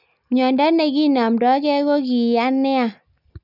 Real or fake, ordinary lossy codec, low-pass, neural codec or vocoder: real; none; 5.4 kHz; none